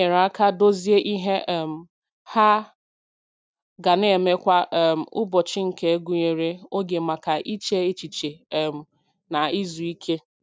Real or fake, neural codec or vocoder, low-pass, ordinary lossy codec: real; none; none; none